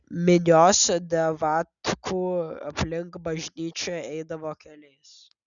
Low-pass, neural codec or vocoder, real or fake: 7.2 kHz; none; real